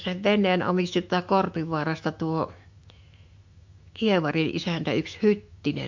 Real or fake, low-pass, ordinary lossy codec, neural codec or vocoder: fake; 7.2 kHz; MP3, 48 kbps; autoencoder, 48 kHz, 128 numbers a frame, DAC-VAE, trained on Japanese speech